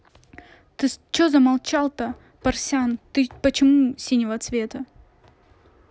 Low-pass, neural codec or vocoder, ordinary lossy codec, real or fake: none; none; none; real